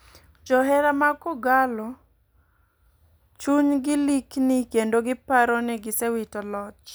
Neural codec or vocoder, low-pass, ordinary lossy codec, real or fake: none; none; none; real